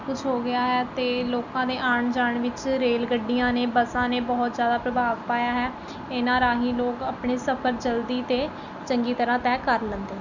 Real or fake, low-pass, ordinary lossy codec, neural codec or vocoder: real; 7.2 kHz; none; none